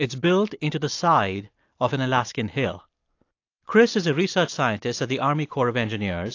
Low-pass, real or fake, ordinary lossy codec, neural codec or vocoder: 7.2 kHz; real; AAC, 48 kbps; none